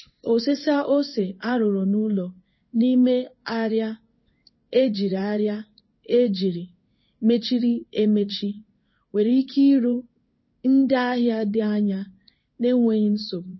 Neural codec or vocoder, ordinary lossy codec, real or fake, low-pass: codec, 16 kHz in and 24 kHz out, 1 kbps, XY-Tokenizer; MP3, 24 kbps; fake; 7.2 kHz